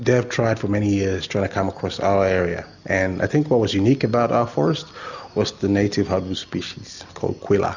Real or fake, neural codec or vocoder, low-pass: real; none; 7.2 kHz